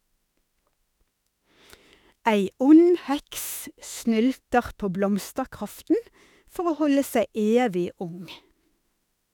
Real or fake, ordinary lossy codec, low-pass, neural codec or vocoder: fake; none; 19.8 kHz; autoencoder, 48 kHz, 32 numbers a frame, DAC-VAE, trained on Japanese speech